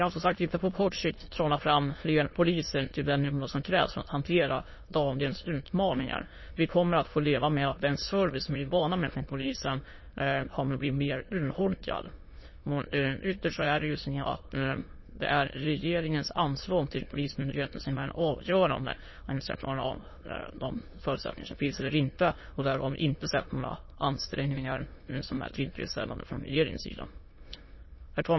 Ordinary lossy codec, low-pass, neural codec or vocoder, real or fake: MP3, 24 kbps; 7.2 kHz; autoencoder, 22.05 kHz, a latent of 192 numbers a frame, VITS, trained on many speakers; fake